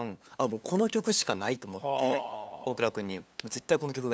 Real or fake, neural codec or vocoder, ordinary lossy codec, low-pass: fake; codec, 16 kHz, 2 kbps, FunCodec, trained on LibriTTS, 25 frames a second; none; none